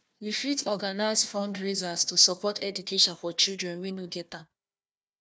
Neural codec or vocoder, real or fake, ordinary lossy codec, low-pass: codec, 16 kHz, 1 kbps, FunCodec, trained on Chinese and English, 50 frames a second; fake; none; none